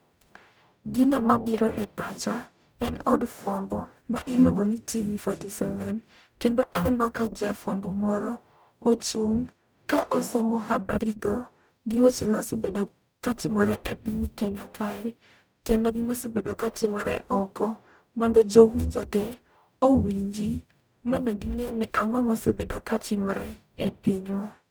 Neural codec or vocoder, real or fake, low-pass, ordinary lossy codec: codec, 44.1 kHz, 0.9 kbps, DAC; fake; none; none